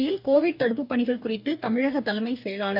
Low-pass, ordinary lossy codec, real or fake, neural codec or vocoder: 5.4 kHz; none; fake; codec, 44.1 kHz, 2.6 kbps, DAC